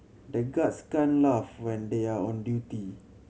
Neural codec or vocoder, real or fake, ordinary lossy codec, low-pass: none; real; none; none